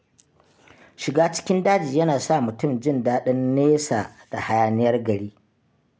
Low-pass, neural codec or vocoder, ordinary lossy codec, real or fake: none; none; none; real